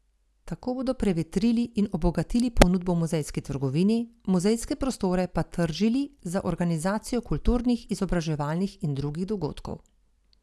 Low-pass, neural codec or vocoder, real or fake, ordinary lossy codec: none; none; real; none